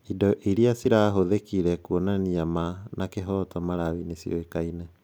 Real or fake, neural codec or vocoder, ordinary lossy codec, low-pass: real; none; none; none